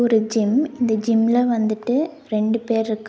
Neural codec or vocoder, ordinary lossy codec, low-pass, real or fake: none; none; none; real